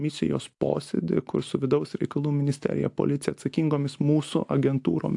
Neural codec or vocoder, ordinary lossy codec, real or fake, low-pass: none; AAC, 64 kbps; real; 10.8 kHz